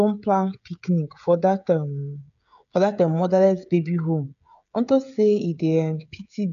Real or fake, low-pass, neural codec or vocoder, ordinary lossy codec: fake; 7.2 kHz; codec, 16 kHz, 16 kbps, FreqCodec, smaller model; none